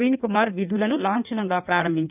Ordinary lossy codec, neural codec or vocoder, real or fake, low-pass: none; codec, 16 kHz in and 24 kHz out, 1.1 kbps, FireRedTTS-2 codec; fake; 3.6 kHz